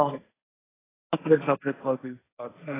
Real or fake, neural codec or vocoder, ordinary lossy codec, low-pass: fake; codec, 16 kHz, 1.1 kbps, Voila-Tokenizer; AAC, 16 kbps; 3.6 kHz